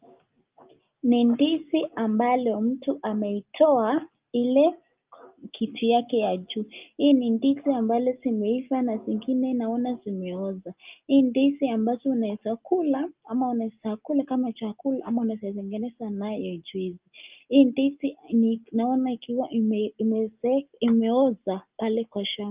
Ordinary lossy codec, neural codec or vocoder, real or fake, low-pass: Opus, 32 kbps; none; real; 3.6 kHz